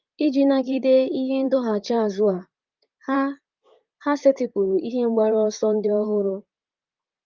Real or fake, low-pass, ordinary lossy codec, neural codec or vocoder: fake; 7.2 kHz; Opus, 24 kbps; vocoder, 44.1 kHz, 128 mel bands, Pupu-Vocoder